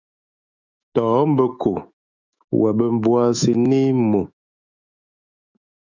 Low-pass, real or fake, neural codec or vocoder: 7.2 kHz; fake; autoencoder, 48 kHz, 128 numbers a frame, DAC-VAE, trained on Japanese speech